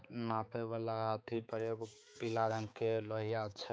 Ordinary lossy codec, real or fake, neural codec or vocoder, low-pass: none; fake; codec, 16 kHz, 4 kbps, X-Codec, HuBERT features, trained on balanced general audio; none